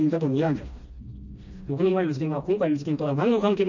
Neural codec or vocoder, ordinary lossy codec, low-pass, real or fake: codec, 16 kHz, 1 kbps, FreqCodec, smaller model; none; 7.2 kHz; fake